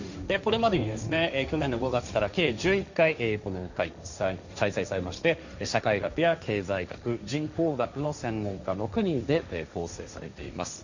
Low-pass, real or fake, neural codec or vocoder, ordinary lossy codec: 7.2 kHz; fake; codec, 16 kHz, 1.1 kbps, Voila-Tokenizer; none